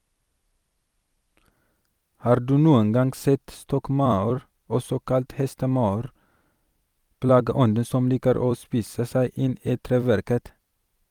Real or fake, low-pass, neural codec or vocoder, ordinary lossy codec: fake; 19.8 kHz; vocoder, 44.1 kHz, 128 mel bands every 256 samples, BigVGAN v2; Opus, 32 kbps